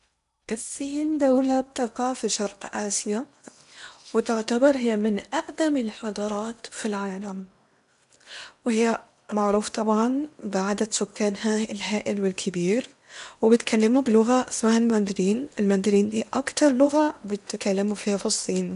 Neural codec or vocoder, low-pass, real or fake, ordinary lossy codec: codec, 16 kHz in and 24 kHz out, 0.8 kbps, FocalCodec, streaming, 65536 codes; 10.8 kHz; fake; none